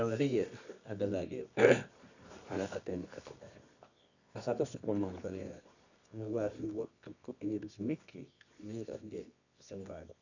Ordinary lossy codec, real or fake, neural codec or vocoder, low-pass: none; fake; codec, 24 kHz, 0.9 kbps, WavTokenizer, medium music audio release; 7.2 kHz